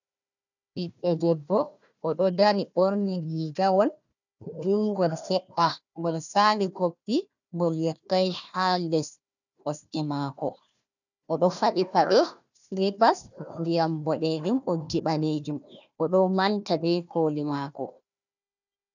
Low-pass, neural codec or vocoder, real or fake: 7.2 kHz; codec, 16 kHz, 1 kbps, FunCodec, trained on Chinese and English, 50 frames a second; fake